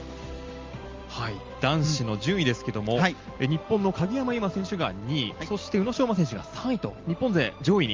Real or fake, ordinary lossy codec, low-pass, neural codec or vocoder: real; Opus, 32 kbps; 7.2 kHz; none